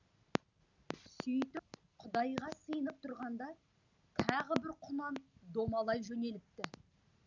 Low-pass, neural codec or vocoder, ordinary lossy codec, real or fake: 7.2 kHz; none; none; real